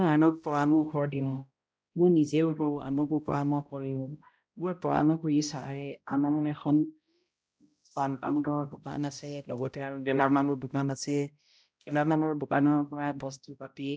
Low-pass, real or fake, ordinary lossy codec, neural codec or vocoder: none; fake; none; codec, 16 kHz, 0.5 kbps, X-Codec, HuBERT features, trained on balanced general audio